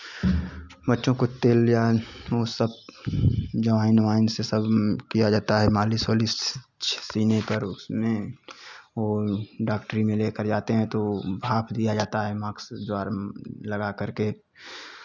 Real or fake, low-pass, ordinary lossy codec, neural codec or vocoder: real; 7.2 kHz; none; none